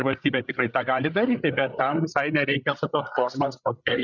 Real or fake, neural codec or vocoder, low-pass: fake; codec, 16 kHz, 16 kbps, FreqCodec, larger model; 7.2 kHz